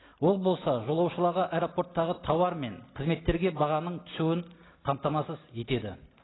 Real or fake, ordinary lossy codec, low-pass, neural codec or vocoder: real; AAC, 16 kbps; 7.2 kHz; none